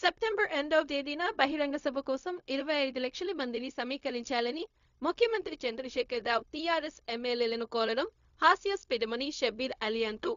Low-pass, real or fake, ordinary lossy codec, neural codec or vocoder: 7.2 kHz; fake; none; codec, 16 kHz, 0.4 kbps, LongCat-Audio-Codec